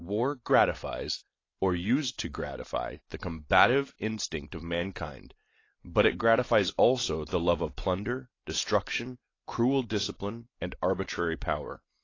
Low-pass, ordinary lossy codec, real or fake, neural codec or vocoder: 7.2 kHz; AAC, 32 kbps; real; none